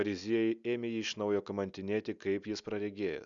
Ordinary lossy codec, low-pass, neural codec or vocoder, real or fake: Opus, 64 kbps; 7.2 kHz; none; real